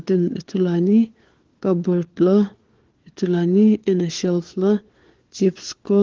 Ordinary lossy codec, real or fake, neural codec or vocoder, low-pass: Opus, 16 kbps; fake; codec, 16 kHz, 4 kbps, FunCodec, trained on LibriTTS, 50 frames a second; 7.2 kHz